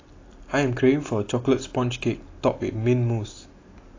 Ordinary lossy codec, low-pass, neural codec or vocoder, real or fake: AAC, 32 kbps; 7.2 kHz; none; real